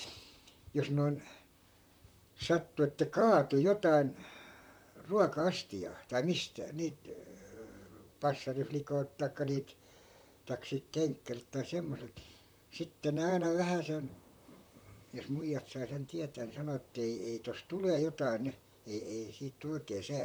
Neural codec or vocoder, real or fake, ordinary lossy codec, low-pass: vocoder, 44.1 kHz, 128 mel bands, Pupu-Vocoder; fake; none; none